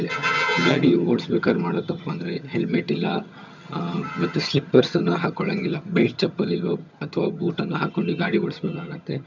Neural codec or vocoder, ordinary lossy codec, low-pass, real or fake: vocoder, 22.05 kHz, 80 mel bands, HiFi-GAN; none; 7.2 kHz; fake